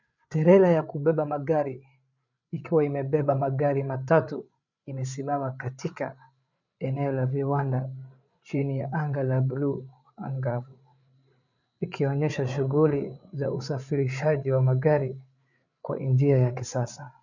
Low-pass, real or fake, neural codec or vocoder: 7.2 kHz; fake; codec, 16 kHz, 8 kbps, FreqCodec, larger model